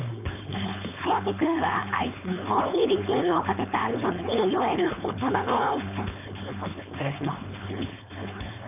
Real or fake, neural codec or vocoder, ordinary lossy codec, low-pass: fake; codec, 16 kHz, 4.8 kbps, FACodec; none; 3.6 kHz